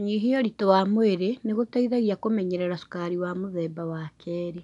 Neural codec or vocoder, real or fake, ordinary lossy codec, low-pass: none; real; none; 10.8 kHz